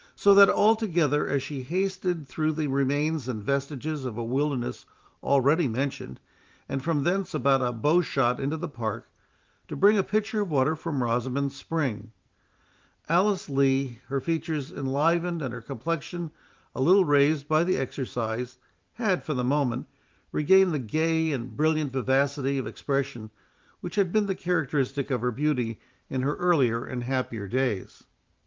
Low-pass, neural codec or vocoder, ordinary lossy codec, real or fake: 7.2 kHz; vocoder, 44.1 kHz, 128 mel bands every 512 samples, BigVGAN v2; Opus, 24 kbps; fake